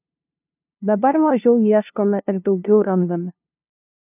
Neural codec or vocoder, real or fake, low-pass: codec, 16 kHz, 0.5 kbps, FunCodec, trained on LibriTTS, 25 frames a second; fake; 3.6 kHz